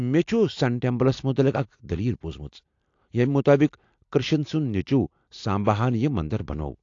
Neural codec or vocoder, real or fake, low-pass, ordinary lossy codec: none; real; 7.2 kHz; AAC, 48 kbps